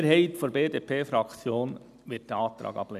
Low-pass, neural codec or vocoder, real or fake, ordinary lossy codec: 14.4 kHz; none; real; none